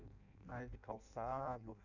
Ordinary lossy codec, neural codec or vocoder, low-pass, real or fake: none; codec, 16 kHz in and 24 kHz out, 0.6 kbps, FireRedTTS-2 codec; 7.2 kHz; fake